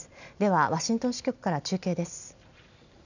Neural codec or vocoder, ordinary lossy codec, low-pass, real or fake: none; none; 7.2 kHz; real